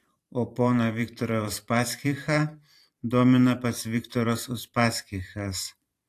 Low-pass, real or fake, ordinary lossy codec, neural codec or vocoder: 14.4 kHz; fake; AAC, 64 kbps; vocoder, 44.1 kHz, 128 mel bands every 512 samples, BigVGAN v2